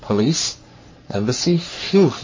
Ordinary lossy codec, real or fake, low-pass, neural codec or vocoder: MP3, 32 kbps; fake; 7.2 kHz; codec, 44.1 kHz, 3.4 kbps, Pupu-Codec